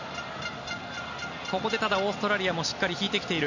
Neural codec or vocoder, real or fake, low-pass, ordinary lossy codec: none; real; 7.2 kHz; none